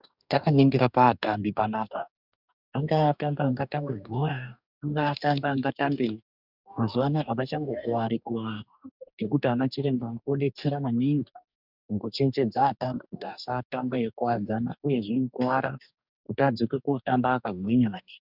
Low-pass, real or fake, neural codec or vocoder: 5.4 kHz; fake; codec, 44.1 kHz, 2.6 kbps, DAC